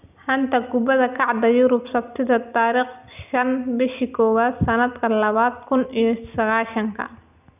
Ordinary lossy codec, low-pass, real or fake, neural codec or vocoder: none; 3.6 kHz; real; none